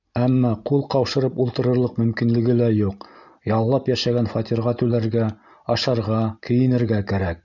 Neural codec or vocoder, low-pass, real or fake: none; 7.2 kHz; real